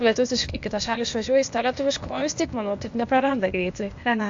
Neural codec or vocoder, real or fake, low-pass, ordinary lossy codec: codec, 16 kHz, 0.8 kbps, ZipCodec; fake; 7.2 kHz; AAC, 64 kbps